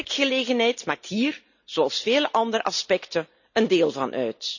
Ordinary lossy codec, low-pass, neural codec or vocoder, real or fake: none; 7.2 kHz; none; real